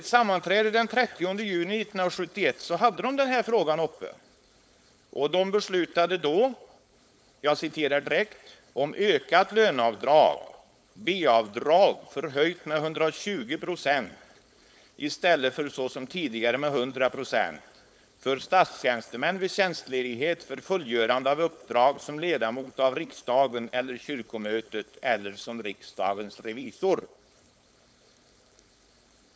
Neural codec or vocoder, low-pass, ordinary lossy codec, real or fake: codec, 16 kHz, 4.8 kbps, FACodec; none; none; fake